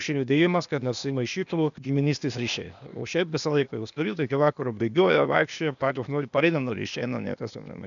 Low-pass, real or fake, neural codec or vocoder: 7.2 kHz; fake; codec, 16 kHz, 0.8 kbps, ZipCodec